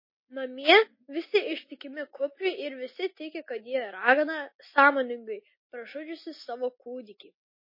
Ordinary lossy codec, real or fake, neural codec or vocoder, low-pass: MP3, 24 kbps; real; none; 5.4 kHz